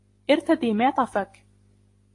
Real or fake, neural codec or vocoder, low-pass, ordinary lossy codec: real; none; 10.8 kHz; AAC, 48 kbps